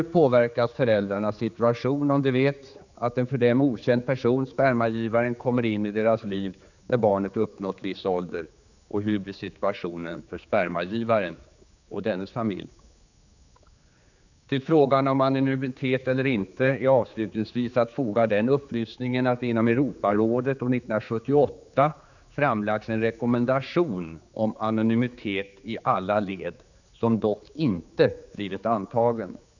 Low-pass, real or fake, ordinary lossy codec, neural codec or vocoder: 7.2 kHz; fake; none; codec, 16 kHz, 4 kbps, X-Codec, HuBERT features, trained on general audio